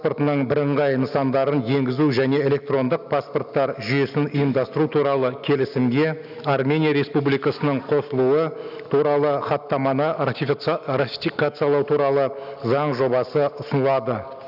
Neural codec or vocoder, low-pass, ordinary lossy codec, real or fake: none; 5.4 kHz; none; real